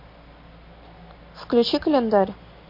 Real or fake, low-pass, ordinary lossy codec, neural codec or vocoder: real; 5.4 kHz; MP3, 32 kbps; none